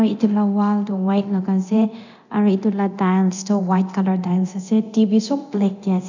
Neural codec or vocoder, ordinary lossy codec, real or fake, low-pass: codec, 24 kHz, 0.9 kbps, DualCodec; none; fake; 7.2 kHz